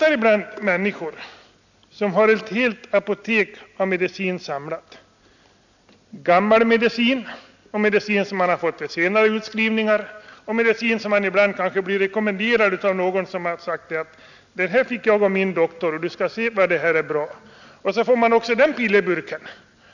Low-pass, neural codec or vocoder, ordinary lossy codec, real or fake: 7.2 kHz; none; none; real